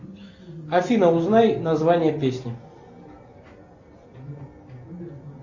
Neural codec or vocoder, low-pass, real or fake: none; 7.2 kHz; real